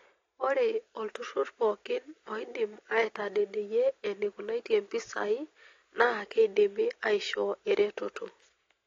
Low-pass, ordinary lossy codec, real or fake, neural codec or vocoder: 7.2 kHz; AAC, 32 kbps; real; none